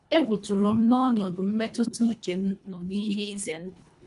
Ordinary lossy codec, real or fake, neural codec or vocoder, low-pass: none; fake; codec, 24 kHz, 1.5 kbps, HILCodec; 10.8 kHz